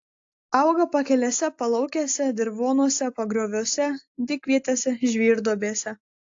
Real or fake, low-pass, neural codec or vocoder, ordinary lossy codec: real; 7.2 kHz; none; AAC, 48 kbps